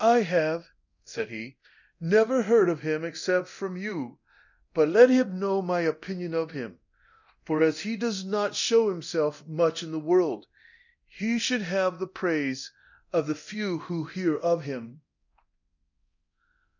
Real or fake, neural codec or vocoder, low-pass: fake; codec, 24 kHz, 0.9 kbps, DualCodec; 7.2 kHz